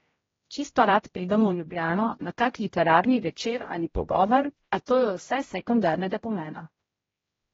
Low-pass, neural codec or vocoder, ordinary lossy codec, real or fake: 7.2 kHz; codec, 16 kHz, 0.5 kbps, X-Codec, HuBERT features, trained on general audio; AAC, 24 kbps; fake